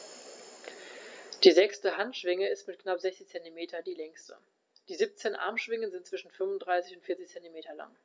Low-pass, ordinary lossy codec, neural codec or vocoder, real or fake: none; none; none; real